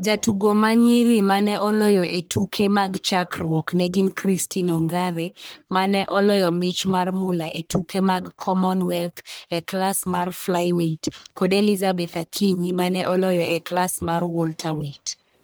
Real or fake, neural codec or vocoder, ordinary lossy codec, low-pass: fake; codec, 44.1 kHz, 1.7 kbps, Pupu-Codec; none; none